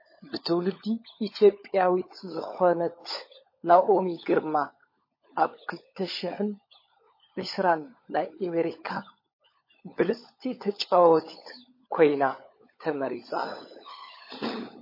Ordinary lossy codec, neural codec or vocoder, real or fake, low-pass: MP3, 24 kbps; codec, 16 kHz, 16 kbps, FunCodec, trained on LibriTTS, 50 frames a second; fake; 5.4 kHz